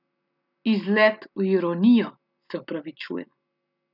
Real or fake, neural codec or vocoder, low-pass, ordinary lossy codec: real; none; 5.4 kHz; none